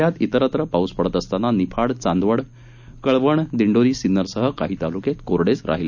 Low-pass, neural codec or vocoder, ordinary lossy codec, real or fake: 7.2 kHz; none; none; real